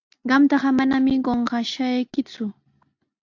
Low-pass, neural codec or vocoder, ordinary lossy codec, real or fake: 7.2 kHz; none; AAC, 48 kbps; real